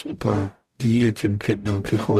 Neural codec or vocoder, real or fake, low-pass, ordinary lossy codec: codec, 44.1 kHz, 0.9 kbps, DAC; fake; 14.4 kHz; Opus, 64 kbps